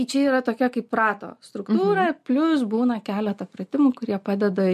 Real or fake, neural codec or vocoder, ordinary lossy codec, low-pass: real; none; MP3, 64 kbps; 14.4 kHz